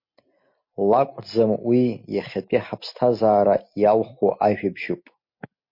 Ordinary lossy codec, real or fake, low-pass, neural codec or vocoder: MP3, 32 kbps; real; 5.4 kHz; none